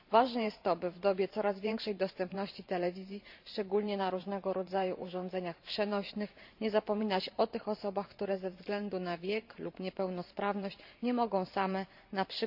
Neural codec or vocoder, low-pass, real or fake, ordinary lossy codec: vocoder, 44.1 kHz, 128 mel bands every 512 samples, BigVGAN v2; 5.4 kHz; fake; none